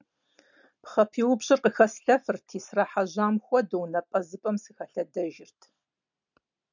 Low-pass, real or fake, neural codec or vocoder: 7.2 kHz; real; none